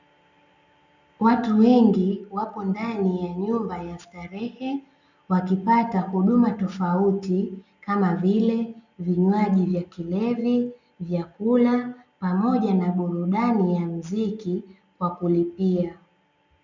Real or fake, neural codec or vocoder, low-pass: real; none; 7.2 kHz